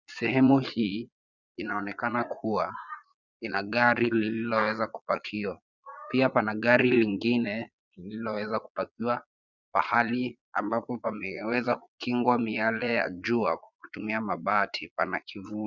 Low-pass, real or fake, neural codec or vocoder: 7.2 kHz; fake; vocoder, 22.05 kHz, 80 mel bands, Vocos